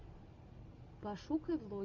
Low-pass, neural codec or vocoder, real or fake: 7.2 kHz; none; real